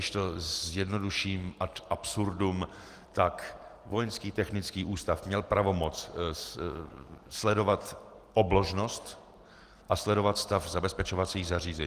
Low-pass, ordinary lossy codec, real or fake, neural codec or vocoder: 14.4 kHz; Opus, 24 kbps; real; none